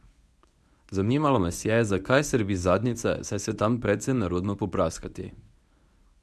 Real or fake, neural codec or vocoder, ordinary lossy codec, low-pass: fake; codec, 24 kHz, 0.9 kbps, WavTokenizer, medium speech release version 1; none; none